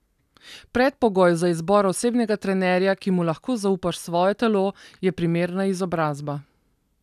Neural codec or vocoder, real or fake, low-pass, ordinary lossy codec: none; real; 14.4 kHz; none